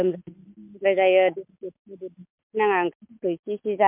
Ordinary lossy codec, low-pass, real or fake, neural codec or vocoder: MP3, 32 kbps; 3.6 kHz; real; none